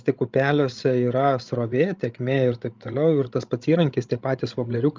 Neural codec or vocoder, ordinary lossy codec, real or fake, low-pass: codec, 16 kHz, 16 kbps, FreqCodec, larger model; Opus, 32 kbps; fake; 7.2 kHz